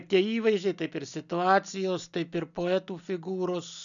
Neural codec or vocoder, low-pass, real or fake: none; 7.2 kHz; real